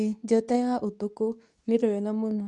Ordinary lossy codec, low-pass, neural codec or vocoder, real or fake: none; 10.8 kHz; codec, 24 kHz, 0.9 kbps, WavTokenizer, medium speech release version 2; fake